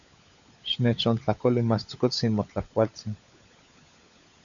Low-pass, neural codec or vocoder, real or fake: 7.2 kHz; codec, 16 kHz, 16 kbps, FunCodec, trained on LibriTTS, 50 frames a second; fake